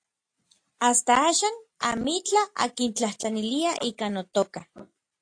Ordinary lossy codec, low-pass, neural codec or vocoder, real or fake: AAC, 48 kbps; 9.9 kHz; none; real